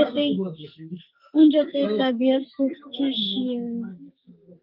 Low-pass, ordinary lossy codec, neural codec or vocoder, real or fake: 5.4 kHz; Opus, 24 kbps; codec, 44.1 kHz, 2.6 kbps, SNAC; fake